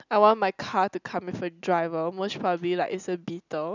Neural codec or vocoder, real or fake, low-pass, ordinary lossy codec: none; real; 7.2 kHz; none